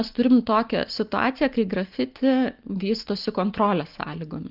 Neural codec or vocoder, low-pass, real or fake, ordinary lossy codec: none; 5.4 kHz; real; Opus, 32 kbps